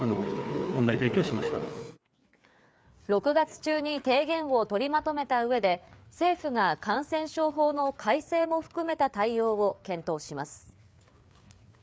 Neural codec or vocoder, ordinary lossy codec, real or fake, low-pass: codec, 16 kHz, 4 kbps, FreqCodec, larger model; none; fake; none